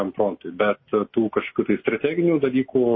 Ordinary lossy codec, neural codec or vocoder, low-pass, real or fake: MP3, 24 kbps; none; 7.2 kHz; real